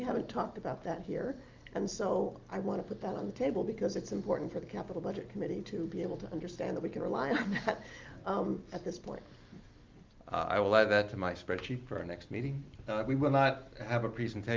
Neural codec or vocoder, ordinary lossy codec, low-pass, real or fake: none; Opus, 24 kbps; 7.2 kHz; real